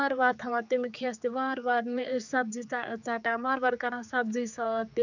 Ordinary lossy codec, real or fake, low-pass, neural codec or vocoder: none; fake; 7.2 kHz; codec, 16 kHz, 4 kbps, X-Codec, HuBERT features, trained on general audio